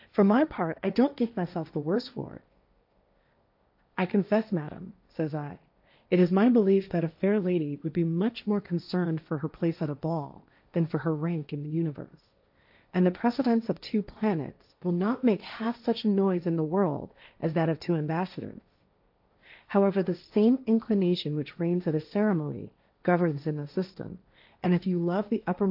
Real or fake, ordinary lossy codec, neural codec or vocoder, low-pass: fake; AAC, 48 kbps; codec, 16 kHz, 1.1 kbps, Voila-Tokenizer; 5.4 kHz